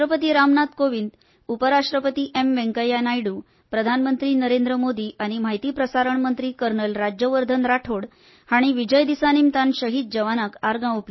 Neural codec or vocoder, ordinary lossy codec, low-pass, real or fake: none; MP3, 24 kbps; 7.2 kHz; real